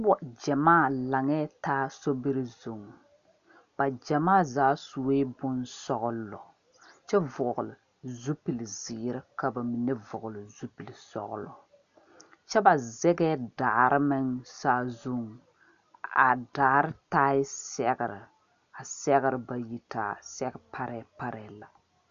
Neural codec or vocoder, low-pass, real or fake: none; 7.2 kHz; real